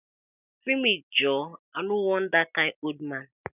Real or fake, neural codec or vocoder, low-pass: real; none; 3.6 kHz